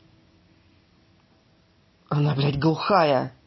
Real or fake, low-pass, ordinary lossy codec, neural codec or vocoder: real; 7.2 kHz; MP3, 24 kbps; none